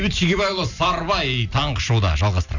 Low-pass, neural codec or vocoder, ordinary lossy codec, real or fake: 7.2 kHz; none; none; real